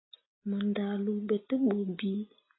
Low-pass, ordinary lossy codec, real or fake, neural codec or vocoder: 7.2 kHz; AAC, 16 kbps; real; none